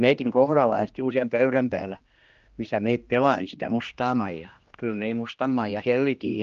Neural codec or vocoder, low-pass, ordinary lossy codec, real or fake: codec, 16 kHz, 1 kbps, X-Codec, HuBERT features, trained on general audio; 7.2 kHz; Opus, 24 kbps; fake